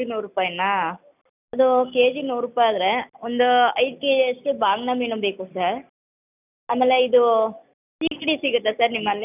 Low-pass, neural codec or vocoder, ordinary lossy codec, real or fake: 3.6 kHz; none; none; real